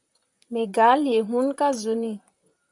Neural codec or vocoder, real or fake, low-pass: vocoder, 44.1 kHz, 128 mel bands, Pupu-Vocoder; fake; 10.8 kHz